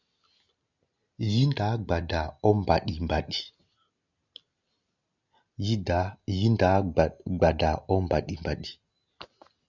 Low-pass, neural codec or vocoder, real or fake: 7.2 kHz; none; real